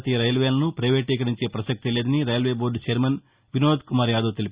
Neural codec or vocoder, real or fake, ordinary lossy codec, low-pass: none; real; Opus, 64 kbps; 3.6 kHz